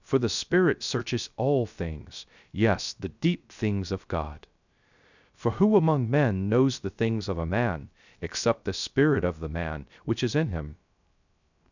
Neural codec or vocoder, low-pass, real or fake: codec, 16 kHz, 0.3 kbps, FocalCodec; 7.2 kHz; fake